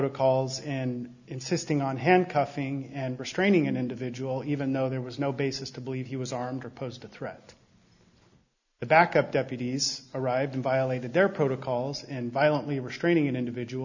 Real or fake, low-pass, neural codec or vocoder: real; 7.2 kHz; none